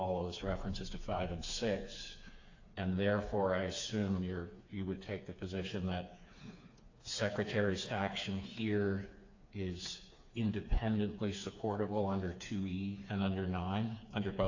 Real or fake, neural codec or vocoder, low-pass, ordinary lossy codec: fake; codec, 16 kHz, 4 kbps, FreqCodec, smaller model; 7.2 kHz; AAC, 48 kbps